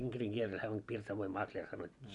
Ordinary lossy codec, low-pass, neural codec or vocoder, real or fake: none; 10.8 kHz; none; real